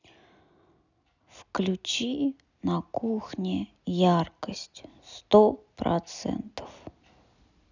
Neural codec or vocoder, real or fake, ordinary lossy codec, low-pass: none; real; none; 7.2 kHz